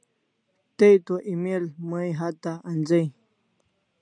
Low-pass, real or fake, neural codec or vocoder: 9.9 kHz; real; none